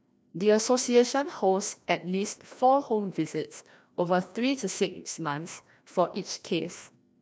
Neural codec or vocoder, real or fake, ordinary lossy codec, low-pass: codec, 16 kHz, 1 kbps, FreqCodec, larger model; fake; none; none